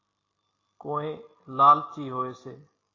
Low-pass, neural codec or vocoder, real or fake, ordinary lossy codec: 7.2 kHz; none; real; AAC, 32 kbps